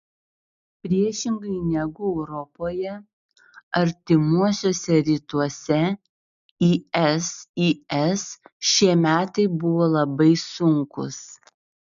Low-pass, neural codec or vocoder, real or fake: 7.2 kHz; none; real